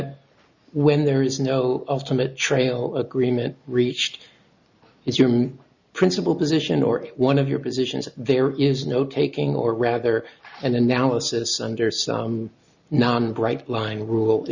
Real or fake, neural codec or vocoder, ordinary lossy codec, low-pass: real; none; Opus, 64 kbps; 7.2 kHz